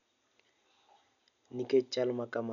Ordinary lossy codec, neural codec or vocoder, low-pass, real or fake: none; none; 7.2 kHz; real